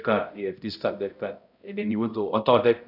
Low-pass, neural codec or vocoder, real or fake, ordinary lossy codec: 5.4 kHz; codec, 16 kHz, 0.5 kbps, X-Codec, HuBERT features, trained on balanced general audio; fake; none